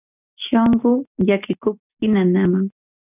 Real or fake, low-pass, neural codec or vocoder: real; 3.6 kHz; none